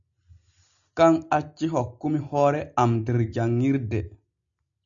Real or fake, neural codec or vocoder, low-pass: real; none; 7.2 kHz